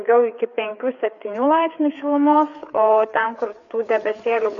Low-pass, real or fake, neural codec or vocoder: 7.2 kHz; fake; codec, 16 kHz, 4 kbps, FreqCodec, larger model